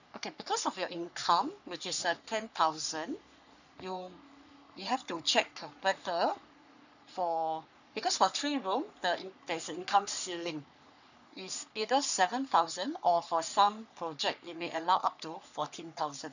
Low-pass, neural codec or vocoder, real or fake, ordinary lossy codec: 7.2 kHz; codec, 44.1 kHz, 3.4 kbps, Pupu-Codec; fake; none